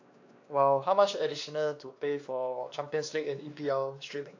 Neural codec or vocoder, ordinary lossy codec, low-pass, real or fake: codec, 16 kHz, 2 kbps, X-Codec, WavLM features, trained on Multilingual LibriSpeech; AAC, 48 kbps; 7.2 kHz; fake